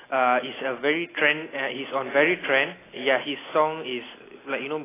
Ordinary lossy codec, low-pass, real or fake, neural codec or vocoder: AAC, 16 kbps; 3.6 kHz; real; none